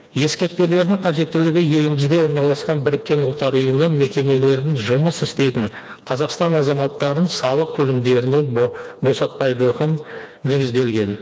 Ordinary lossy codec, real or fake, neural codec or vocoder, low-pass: none; fake; codec, 16 kHz, 2 kbps, FreqCodec, smaller model; none